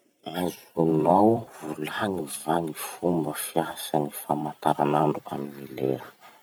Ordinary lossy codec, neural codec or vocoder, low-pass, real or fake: none; vocoder, 44.1 kHz, 128 mel bands every 512 samples, BigVGAN v2; none; fake